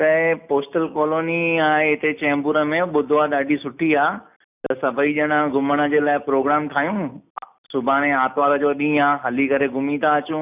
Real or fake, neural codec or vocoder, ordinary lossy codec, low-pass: real; none; none; 3.6 kHz